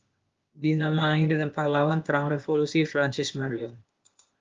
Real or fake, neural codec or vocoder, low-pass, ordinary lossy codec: fake; codec, 16 kHz, 0.8 kbps, ZipCodec; 7.2 kHz; Opus, 32 kbps